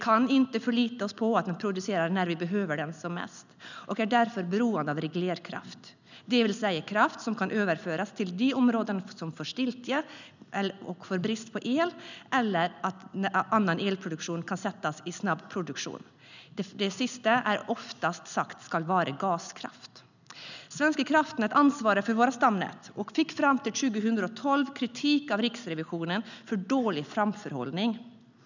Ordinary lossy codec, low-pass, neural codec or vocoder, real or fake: none; 7.2 kHz; none; real